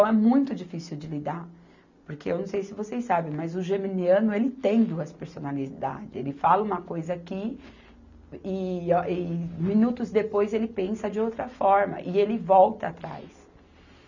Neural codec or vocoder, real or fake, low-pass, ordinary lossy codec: none; real; 7.2 kHz; none